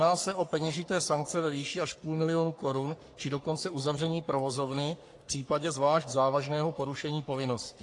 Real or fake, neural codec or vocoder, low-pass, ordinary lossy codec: fake; codec, 44.1 kHz, 3.4 kbps, Pupu-Codec; 10.8 kHz; AAC, 48 kbps